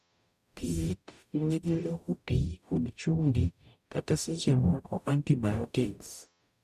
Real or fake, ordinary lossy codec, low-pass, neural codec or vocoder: fake; none; 14.4 kHz; codec, 44.1 kHz, 0.9 kbps, DAC